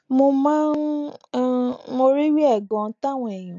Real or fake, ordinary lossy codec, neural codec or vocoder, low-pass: real; AAC, 48 kbps; none; 7.2 kHz